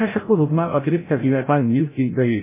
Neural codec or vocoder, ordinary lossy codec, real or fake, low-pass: codec, 16 kHz, 0.5 kbps, FreqCodec, larger model; MP3, 16 kbps; fake; 3.6 kHz